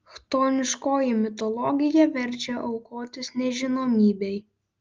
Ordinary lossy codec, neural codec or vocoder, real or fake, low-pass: Opus, 24 kbps; none; real; 7.2 kHz